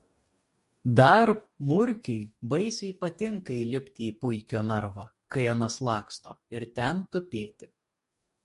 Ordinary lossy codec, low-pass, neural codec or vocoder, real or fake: MP3, 48 kbps; 14.4 kHz; codec, 44.1 kHz, 2.6 kbps, DAC; fake